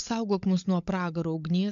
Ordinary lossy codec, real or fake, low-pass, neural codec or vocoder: AAC, 64 kbps; fake; 7.2 kHz; codec, 16 kHz, 16 kbps, FunCodec, trained on LibriTTS, 50 frames a second